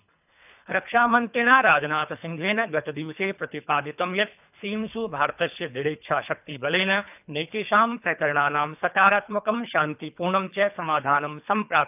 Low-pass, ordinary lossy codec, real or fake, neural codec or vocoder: 3.6 kHz; none; fake; codec, 24 kHz, 3 kbps, HILCodec